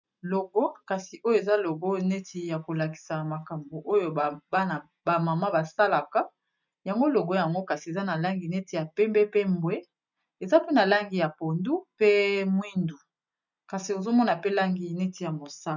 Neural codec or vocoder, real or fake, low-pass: none; real; 7.2 kHz